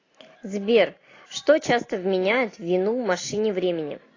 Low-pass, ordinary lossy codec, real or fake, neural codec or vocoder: 7.2 kHz; AAC, 32 kbps; real; none